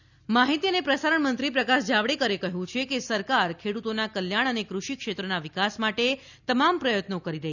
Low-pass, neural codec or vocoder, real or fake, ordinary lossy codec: 7.2 kHz; none; real; none